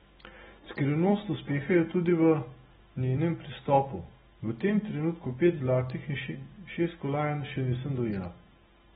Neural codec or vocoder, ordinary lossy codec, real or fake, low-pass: none; AAC, 16 kbps; real; 10.8 kHz